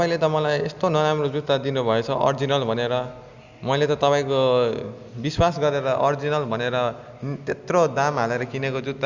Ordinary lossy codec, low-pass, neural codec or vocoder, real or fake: Opus, 64 kbps; 7.2 kHz; none; real